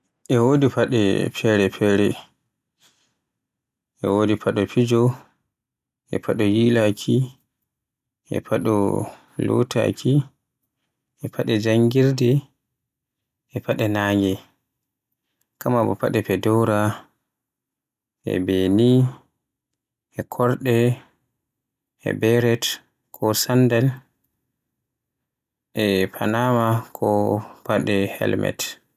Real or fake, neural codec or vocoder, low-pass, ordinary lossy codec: real; none; 14.4 kHz; none